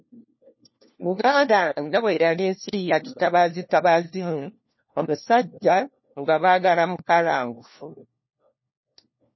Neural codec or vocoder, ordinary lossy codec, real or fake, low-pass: codec, 16 kHz, 1 kbps, FunCodec, trained on LibriTTS, 50 frames a second; MP3, 24 kbps; fake; 7.2 kHz